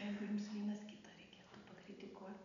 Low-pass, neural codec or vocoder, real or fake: 7.2 kHz; none; real